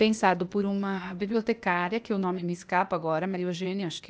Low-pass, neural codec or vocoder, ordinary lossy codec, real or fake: none; codec, 16 kHz, 0.8 kbps, ZipCodec; none; fake